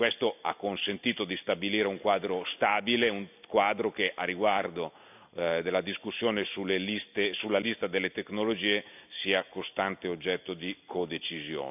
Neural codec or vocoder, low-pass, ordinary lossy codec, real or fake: none; 3.6 kHz; none; real